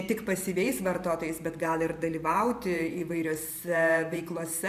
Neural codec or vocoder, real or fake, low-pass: vocoder, 44.1 kHz, 128 mel bands every 512 samples, BigVGAN v2; fake; 14.4 kHz